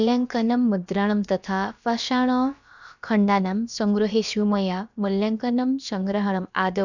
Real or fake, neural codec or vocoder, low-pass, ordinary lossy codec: fake; codec, 16 kHz, about 1 kbps, DyCAST, with the encoder's durations; 7.2 kHz; none